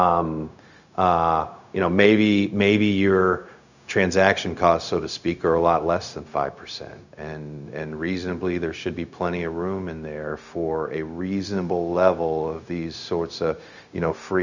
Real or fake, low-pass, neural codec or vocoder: fake; 7.2 kHz; codec, 16 kHz, 0.4 kbps, LongCat-Audio-Codec